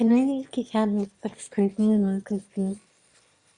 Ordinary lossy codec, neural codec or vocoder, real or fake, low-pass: Opus, 64 kbps; autoencoder, 22.05 kHz, a latent of 192 numbers a frame, VITS, trained on one speaker; fake; 9.9 kHz